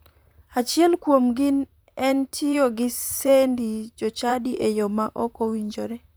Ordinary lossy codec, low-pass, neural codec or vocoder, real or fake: none; none; vocoder, 44.1 kHz, 128 mel bands, Pupu-Vocoder; fake